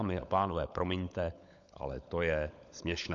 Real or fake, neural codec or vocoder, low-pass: fake; codec, 16 kHz, 16 kbps, FunCodec, trained on LibriTTS, 50 frames a second; 7.2 kHz